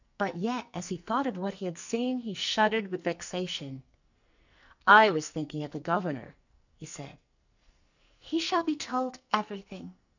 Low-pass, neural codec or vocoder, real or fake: 7.2 kHz; codec, 44.1 kHz, 2.6 kbps, SNAC; fake